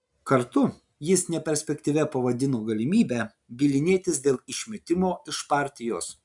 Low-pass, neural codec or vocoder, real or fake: 10.8 kHz; none; real